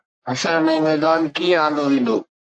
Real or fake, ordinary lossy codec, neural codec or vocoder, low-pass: fake; AAC, 48 kbps; codec, 44.1 kHz, 1.7 kbps, Pupu-Codec; 9.9 kHz